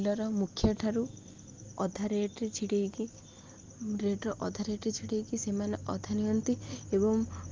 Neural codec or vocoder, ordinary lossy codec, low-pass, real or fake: none; Opus, 32 kbps; 7.2 kHz; real